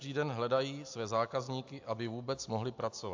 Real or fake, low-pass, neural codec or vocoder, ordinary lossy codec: real; 7.2 kHz; none; AAC, 48 kbps